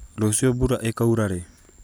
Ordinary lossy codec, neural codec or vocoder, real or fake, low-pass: none; none; real; none